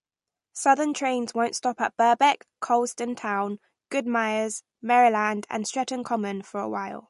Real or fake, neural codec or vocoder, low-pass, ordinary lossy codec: real; none; 14.4 kHz; MP3, 48 kbps